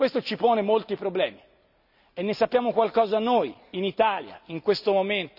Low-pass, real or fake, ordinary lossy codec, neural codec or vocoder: 5.4 kHz; real; none; none